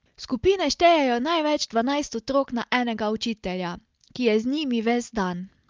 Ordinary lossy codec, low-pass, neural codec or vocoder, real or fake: Opus, 24 kbps; 7.2 kHz; none; real